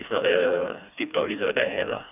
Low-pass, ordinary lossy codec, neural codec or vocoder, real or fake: 3.6 kHz; none; codec, 16 kHz, 2 kbps, FreqCodec, smaller model; fake